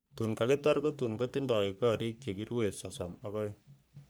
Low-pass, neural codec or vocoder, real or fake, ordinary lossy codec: none; codec, 44.1 kHz, 3.4 kbps, Pupu-Codec; fake; none